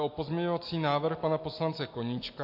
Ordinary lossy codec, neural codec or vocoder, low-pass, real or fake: MP3, 32 kbps; none; 5.4 kHz; real